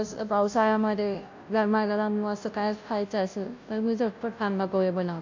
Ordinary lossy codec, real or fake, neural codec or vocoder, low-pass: AAC, 48 kbps; fake; codec, 16 kHz, 0.5 kbps, FunCodec, trained on Chinese and English, 25 frames a second; 7.2 kHz